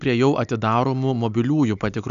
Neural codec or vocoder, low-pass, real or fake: none; 7.2 kHz; real